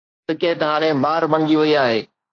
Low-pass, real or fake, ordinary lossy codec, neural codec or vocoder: 7.2 kHz; fake; AAC, 32 kbps; codec, 16 kHz, 1.1 kbps, Voila-Tokenizer